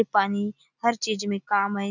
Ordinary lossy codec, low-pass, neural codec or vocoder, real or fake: none; 7.2 kHz; none; real